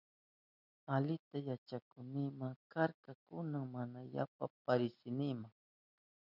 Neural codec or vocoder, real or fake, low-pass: none; real; 5.4 kHz